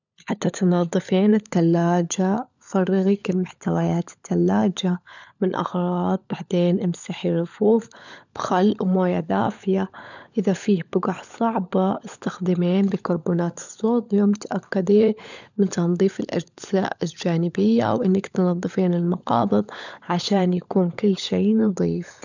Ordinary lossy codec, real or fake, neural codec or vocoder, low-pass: none; fake; codec, 16 kHz, 16 kbps, FunCodec, trained on LibriTTS, 50 frames a second; 7.2 kHz